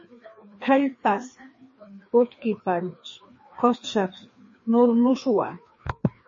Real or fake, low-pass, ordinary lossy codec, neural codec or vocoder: fake; 7.2 kHz; MP3, 32 kbps; codec, 16 kHz, 2 kbps, FreqCodec, larger model